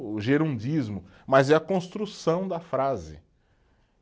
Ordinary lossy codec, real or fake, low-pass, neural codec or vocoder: none; real; none; none